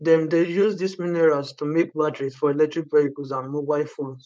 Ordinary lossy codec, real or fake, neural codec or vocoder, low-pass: none; fake; codec, 16 kHz, 4.8 kbps, FACodec; none